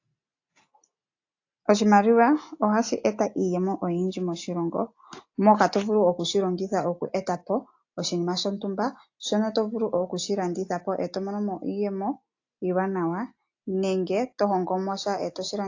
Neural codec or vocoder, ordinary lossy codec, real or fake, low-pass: none; AAC, 48 kbps; real; 7.2 kHz